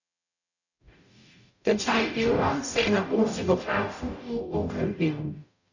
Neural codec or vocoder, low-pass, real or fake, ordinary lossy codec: codec, 44.1 kHz, 0.9 kbps, DAC; 7.2 kHz; fake; none